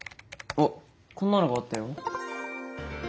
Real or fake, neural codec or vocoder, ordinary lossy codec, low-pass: real; none; none; none